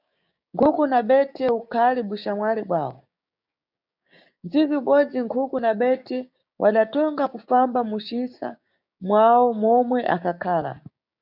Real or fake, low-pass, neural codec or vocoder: fake; 5.4 kHz; codec, 16 kHz, 6 kbps, DAC